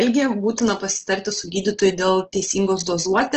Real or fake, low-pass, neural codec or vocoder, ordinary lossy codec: real; 7.2 kHz; none; Opus, 16 kbps